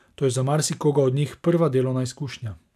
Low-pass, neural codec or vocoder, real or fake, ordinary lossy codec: 14.4 kHz; none; real; none